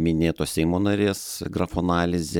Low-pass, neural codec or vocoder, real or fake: 19.8 kHz; none; real